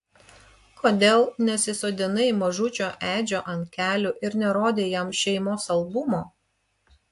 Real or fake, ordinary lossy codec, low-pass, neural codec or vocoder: real; AAC, 64 kbps; 10.8 kHz; none